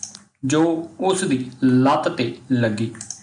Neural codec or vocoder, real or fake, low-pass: none; real; 9.9 kHz